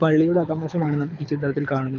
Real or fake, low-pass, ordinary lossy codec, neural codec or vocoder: fake; 7.2 kHz; none; codec, 24 kHz, 6 kbps, HILCodec